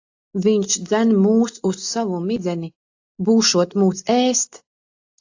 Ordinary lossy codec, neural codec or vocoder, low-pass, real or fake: AAC, 48 kbps; none; 7.2 kHz; real